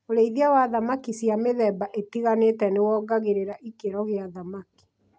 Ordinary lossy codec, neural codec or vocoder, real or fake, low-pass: none; none; real; none